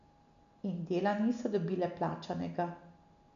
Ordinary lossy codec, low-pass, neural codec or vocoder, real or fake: none; 7.2 kHz; none; real